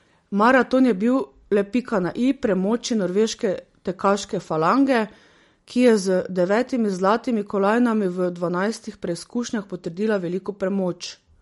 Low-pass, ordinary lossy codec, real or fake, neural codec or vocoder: 19.8 kHz; MP3, 48 kbps; real; none